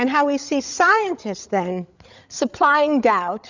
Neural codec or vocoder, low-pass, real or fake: codec, 16 kHz, 8 kbps, FreqCodec, larger model; 7.2 kHz; fake